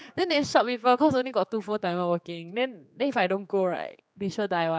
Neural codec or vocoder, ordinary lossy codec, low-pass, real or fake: codec, 16 kHz, 4 kbps, X-Codec, HuBERT features, trained on general audio; none; none; fake